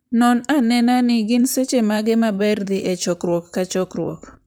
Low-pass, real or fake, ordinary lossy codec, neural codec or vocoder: none; fake; none; vocoder, 44.1 kHz, 128 mel bands, Pupu-Vocoder